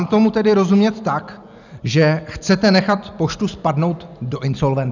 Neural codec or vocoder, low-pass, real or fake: none; 7.2 kHz; real